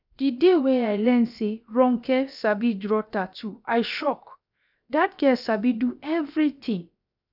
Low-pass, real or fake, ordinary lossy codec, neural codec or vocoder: 5.4 kHz; fake; none; codec, 16 kHz, 0.7 kbps, FocalCodec